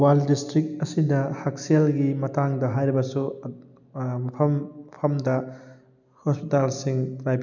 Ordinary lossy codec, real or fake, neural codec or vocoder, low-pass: none; real; none; 7.2 kHz